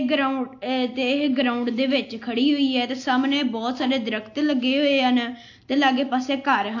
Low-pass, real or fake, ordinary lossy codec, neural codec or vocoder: 7.2 kHz; real; AAC, 48 kbps; none